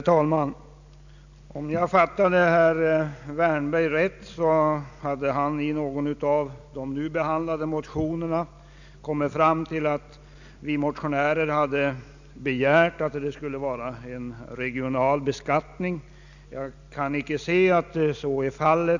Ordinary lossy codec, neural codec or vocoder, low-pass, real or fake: none; none; 7.2 kHz; real